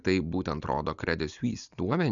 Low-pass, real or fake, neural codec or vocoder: 7.2 kHz; real; none